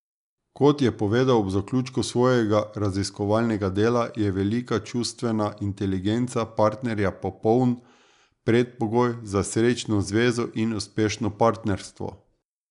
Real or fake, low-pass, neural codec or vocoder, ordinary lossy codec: real; 10.8 kHz; none; none